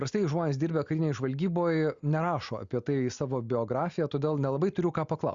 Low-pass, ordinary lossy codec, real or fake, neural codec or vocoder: 7.2 kHz; Opus, 64 kbps; real; none